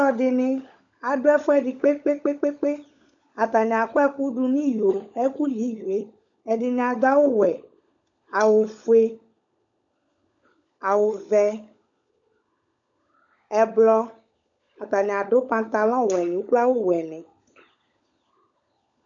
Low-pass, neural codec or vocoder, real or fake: 7.2 kHz; codec, 16 kHz, 16 kbps, FunCodec, trained on LibriTTS, 50 frames a second; fake